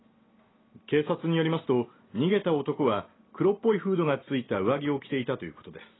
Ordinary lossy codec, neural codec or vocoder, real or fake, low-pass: AAC, 16 kbps; none; real; 7.2 kHz